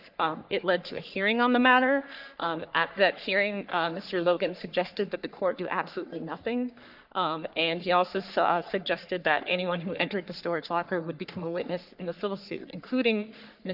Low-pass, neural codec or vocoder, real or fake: 5.4 kHz; codec, 44.1 kHz, 3.4 kbps, Pupu-Codec; fake